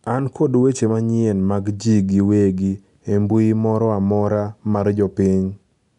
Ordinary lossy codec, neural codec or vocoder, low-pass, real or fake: none; none; 10.8 kHz; real